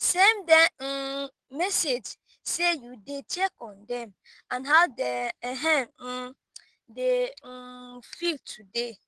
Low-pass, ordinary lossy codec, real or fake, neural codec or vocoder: 10.8 kHz; Opus, 16 kbps; real; none